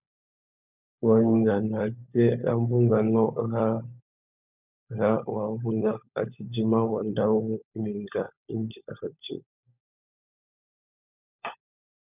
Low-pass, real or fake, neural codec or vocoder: 3.6 kHz; fake; codec, 16 kHz, 16 kbps, FunCodec, trained on LibriTTS, 50 frames a second